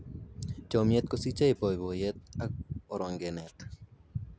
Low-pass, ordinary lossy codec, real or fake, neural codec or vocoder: none; none; real; none